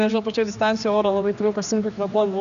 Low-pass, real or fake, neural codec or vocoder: 7.2 kHz; fake; codec, 16 kHz, 2 kbps, X-Codec, HuBERT features, trained on general audio